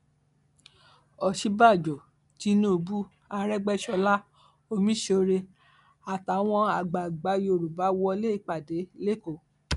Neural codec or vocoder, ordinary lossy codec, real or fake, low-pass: none; none; real; 10.8 kHz